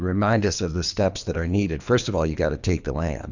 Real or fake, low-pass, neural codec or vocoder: fake; 7.2 kHz; codec, 24 kHz, 3 kbps, HILCodec